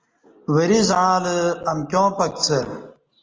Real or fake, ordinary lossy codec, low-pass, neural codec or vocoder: real; Opus, 24 kbps; 7.2 kHz; none